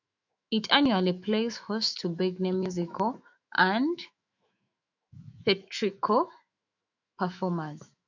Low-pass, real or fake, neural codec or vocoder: 7.2 kHz; fake; autoencoder, 48 kHz, 128 numbers a frame, DAC-VAE, trained on Japanese speech